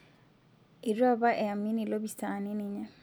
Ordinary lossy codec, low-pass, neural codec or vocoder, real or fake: none; none; none; real